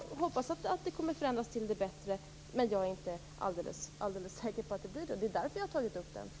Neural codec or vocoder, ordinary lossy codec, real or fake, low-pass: none; none; real; none